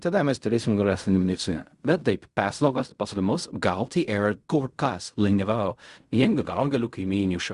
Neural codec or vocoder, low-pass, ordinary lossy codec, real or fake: codec, 16 kHz in and 24 kHz out, 0.4 kbps, LongCat-Audio-Codec, fine tuned four codebook decoder; 10.8 kHz; Opus, 64 kbps; fake